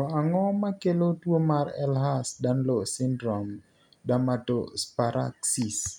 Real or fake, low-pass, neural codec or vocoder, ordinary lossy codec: real; 19.8 kHz; none; none